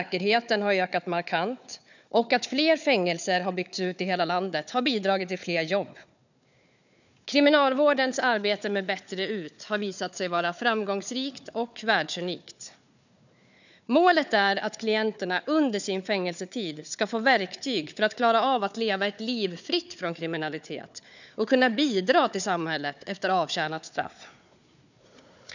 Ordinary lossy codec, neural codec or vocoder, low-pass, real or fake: none; codec, 16 kHz, 4 kbps, FunCodec, trained on Chinese and English, 50 frames a second; 7.2 kHz; fake